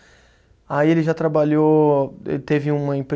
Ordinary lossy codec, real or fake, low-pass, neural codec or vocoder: none; real; none; none